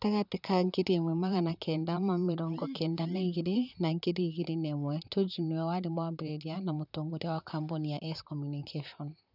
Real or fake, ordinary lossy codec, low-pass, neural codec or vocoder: fake; none; 5.4 kHz; vocoder, 44.1 kHz, 128 mel bands, Pupu-Vocoder